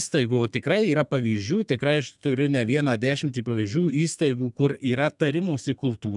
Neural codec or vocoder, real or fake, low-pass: codec, 32 kHz, 1.9 kbps, SNAC; fake; 10.8 kHz